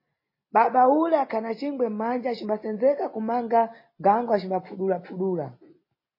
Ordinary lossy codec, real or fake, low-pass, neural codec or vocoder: MP3, 24 kbps; real; 5.4 kHz; none